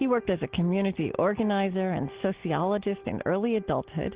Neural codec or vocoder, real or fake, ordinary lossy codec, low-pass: none; real; Opus, 16 kbps; 3.6 kHz